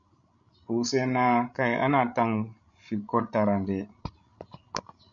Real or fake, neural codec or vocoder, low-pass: fake; codec, 16 kHz, 16 kbps, FreqCodec, larger model; 7.2 kHz